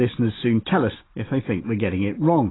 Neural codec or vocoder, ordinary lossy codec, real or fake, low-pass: none; AAC, 16 kbps; real; 7.2 kHz